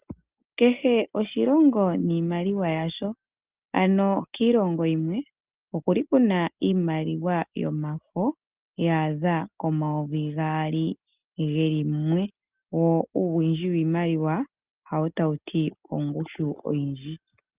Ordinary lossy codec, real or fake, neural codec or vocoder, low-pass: Opus, 32 kbps; real; none; 3.6 kHz